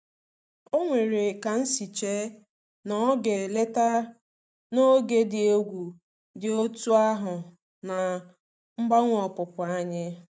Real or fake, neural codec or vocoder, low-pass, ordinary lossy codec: real; none; none; none